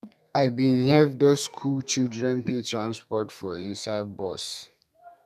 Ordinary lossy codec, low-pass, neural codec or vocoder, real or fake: none; 14.4 kHz; codec, 32 kHz, 1.9 kbps, SNAC; fake